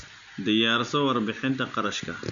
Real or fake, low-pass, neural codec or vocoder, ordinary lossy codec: real; 7.2 kHz; none; none